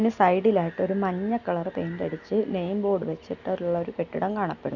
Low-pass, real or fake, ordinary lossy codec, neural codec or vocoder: 7.2 kHz; real; none; none